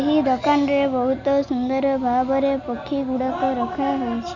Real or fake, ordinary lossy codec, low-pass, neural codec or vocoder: real; none; 7.2 kHz; none